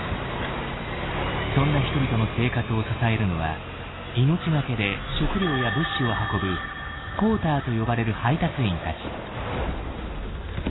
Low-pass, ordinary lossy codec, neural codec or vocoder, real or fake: 7.2 kHz; AAC, 16 kbps; none; real